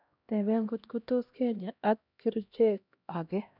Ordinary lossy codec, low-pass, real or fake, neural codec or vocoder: none; 5.4 kHz; fake; codec, 16 kHz, 1 kbps, X-Codec, HuBERT features, trained on LibriSpeech